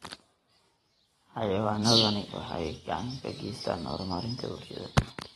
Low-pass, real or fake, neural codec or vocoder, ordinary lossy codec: 19.8 kHz; fake; vocoder, 44.1 kHz, 128 mel bands every 512 samples, BigVGAN v2; AAC, 32 kbps